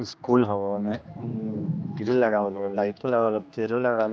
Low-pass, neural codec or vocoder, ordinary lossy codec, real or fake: none; codec, 16 kHz, 2 kbps, X-Codec, HuBERT features, trained on general audio; none; fake